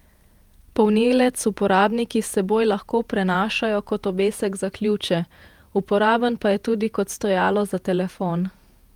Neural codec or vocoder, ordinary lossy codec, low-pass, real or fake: vocoder, 48 kHz, 128 mel bands, Vocos; Opus, 32 kbps; 19.8 kHz; fake